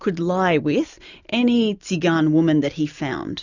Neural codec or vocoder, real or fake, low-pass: none; real; 7.2 kHz